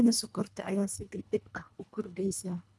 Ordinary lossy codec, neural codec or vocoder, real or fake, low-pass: none; codec, 24 kHz, 1.5 kbps, HILCodec; fake; none